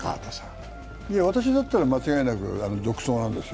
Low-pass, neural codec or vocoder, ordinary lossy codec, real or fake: none; none; none; real